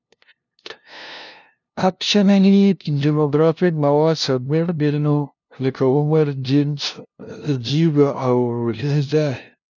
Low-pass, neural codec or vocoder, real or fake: 7.2 kHz; codec, 16 kHz, 0.5 kbps, FunCodec, trained on LibriTTS, 25 frames a second; fake